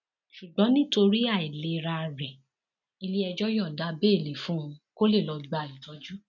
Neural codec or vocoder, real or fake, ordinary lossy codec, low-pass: none; real; none; 7.2 kHz